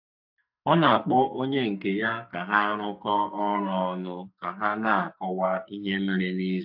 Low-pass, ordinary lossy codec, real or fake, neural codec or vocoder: 5.4 kHz; AAC, 48 kbps; fake; codec, 44.1 kHz, 2.6 kbps, SNAC